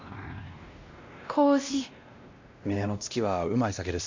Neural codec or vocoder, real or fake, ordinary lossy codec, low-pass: codec, 16 kHz, 1 kbps, X-Codec, WavLM features, trained on Multilingual LibriSpeech; fake; MP3, 48 kbps; 7.2 kHz